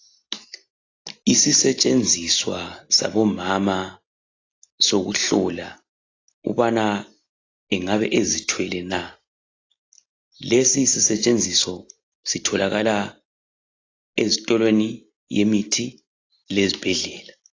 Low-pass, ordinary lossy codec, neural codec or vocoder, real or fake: 7.2 kHz; AAC, 32 kbps; none; real